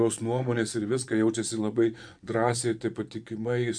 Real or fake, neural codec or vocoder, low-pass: fake; vocoder, 24 kHz, 100 mel bands, Vocos; 9.9 kHz